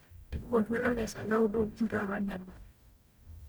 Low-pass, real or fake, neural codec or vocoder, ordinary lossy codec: none; fake; codec, 44.1 kHz, 0.9 kbps, DAC; none